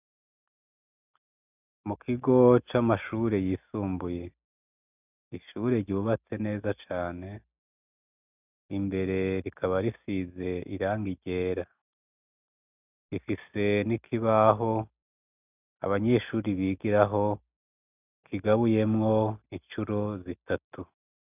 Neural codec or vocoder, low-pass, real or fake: none; 3.6 kHz; real